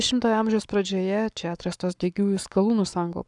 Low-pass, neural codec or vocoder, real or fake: 10.8 kHz; codec, 44.1 kHz, 7.8 kbps, Pupu-Codec; fake